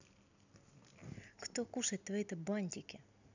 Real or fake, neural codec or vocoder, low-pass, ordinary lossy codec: real; none; 7.2 kHz; none